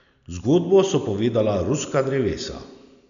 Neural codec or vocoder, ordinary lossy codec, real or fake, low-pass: none; none; real; 7.2 kHz